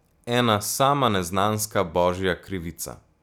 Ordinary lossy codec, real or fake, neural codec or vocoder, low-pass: none; real; none; none